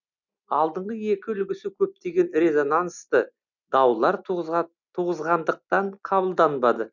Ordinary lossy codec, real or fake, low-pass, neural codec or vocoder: none; real; 7.2 kHz; none